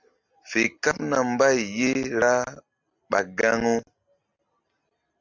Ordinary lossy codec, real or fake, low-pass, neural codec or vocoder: Opus, 64 kbps; real; 7.2 kHz; none